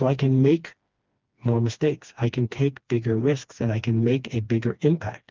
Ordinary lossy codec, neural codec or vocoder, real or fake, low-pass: Opus, 24 kbps; codec, 16 kHz, 2 kbps, FreqCodec, smaller model; fake; 7.2 kHz